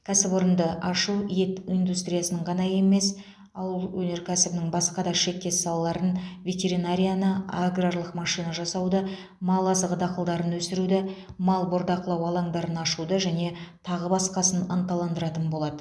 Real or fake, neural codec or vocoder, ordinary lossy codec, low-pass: real; none; none; none